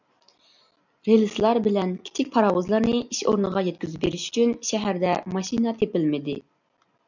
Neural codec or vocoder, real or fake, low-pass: none; real; 7.2 kHz